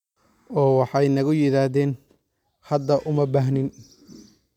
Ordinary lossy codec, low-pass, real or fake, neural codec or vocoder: none; 19.8 kHz; real; none